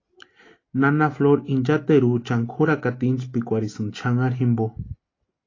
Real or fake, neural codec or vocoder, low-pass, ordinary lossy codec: real; none; 7.2 kHz; AAC, 32 kbps